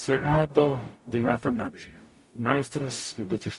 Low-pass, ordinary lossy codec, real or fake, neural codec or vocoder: 14.4 kHz; MP3, 48 kbps; fake; codec, 44.1 kHz, 0.9 kbps, DAC